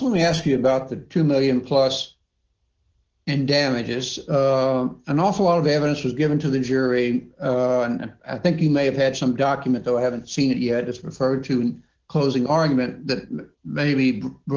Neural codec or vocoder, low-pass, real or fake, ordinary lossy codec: none; 7.2 kHz; real; Opus, 16 kbps